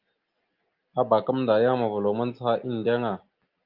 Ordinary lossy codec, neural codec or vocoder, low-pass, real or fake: Opus, 32 kbps; none; 5.4 kHz; real